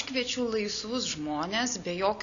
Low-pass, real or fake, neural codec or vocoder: 7.2 kHz; real; none